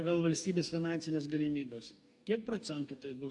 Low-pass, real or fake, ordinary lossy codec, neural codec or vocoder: 10.8 kHz; fake; MP3, 64 kbps; codec, 44.1 kHz, 2.6 kbps, DAC